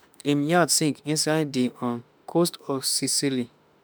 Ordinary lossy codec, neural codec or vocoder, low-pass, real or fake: none; autoencoder, 48 kHz, 32 numbers a frame, DAC-VAE, trained on Japanese speech; none; fake